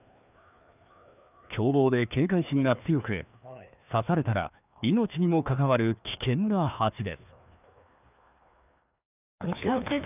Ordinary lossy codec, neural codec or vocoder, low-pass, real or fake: none; codec, 16 kHz, 2 kbps, FreqCodec, larger model; 3.6 kHz; fake